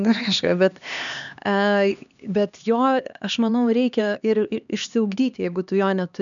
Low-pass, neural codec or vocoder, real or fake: 7.2 kHz; codec, 16 kHz, 2 kbps, X-Codec, HuBERT features, trained on LibriSpeech; fake